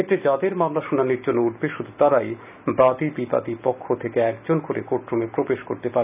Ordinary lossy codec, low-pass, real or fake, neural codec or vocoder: none; 3.6 kHz; real; none